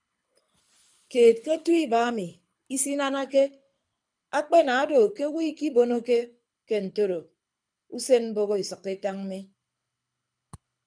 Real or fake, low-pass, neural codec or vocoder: fake; 9.9 kHz; codec, 24 kHz, 6 kbps, HILCodec